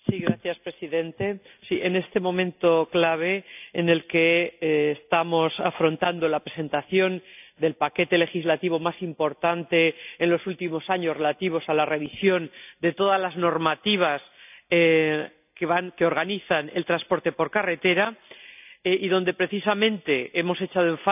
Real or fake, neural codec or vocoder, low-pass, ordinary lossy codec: real; none; 3.6 kHz; none